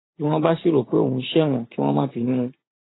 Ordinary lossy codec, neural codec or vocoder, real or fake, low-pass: AAC, 16 kbps; vocoder, 44.1 kHz, 128 mel bands every 512 samples, BigVGAN v2; fake; 7.2 kHz